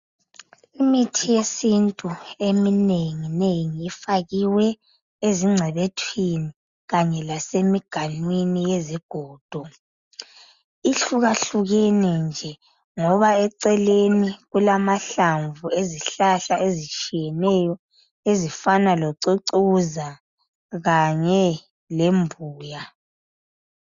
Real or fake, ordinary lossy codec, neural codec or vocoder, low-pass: real; Opus, 64 kbps; none; 7.2 kHz